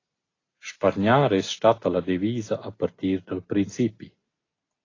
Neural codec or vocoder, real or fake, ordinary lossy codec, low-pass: none; real; AAC, 32 kbps; 7.2 kHz